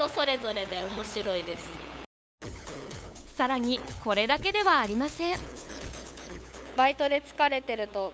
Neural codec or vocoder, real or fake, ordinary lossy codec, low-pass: codec, 16 kHz, 8 kbps, FunCodec, trained on LibriTTS, 25 frames a second; fake; none; none